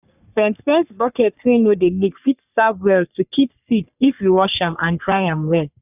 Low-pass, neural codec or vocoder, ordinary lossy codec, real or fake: 3.6 kHz; codec, 44.1 kHz, 3.4 kbps, Pupu-Codec; none; fake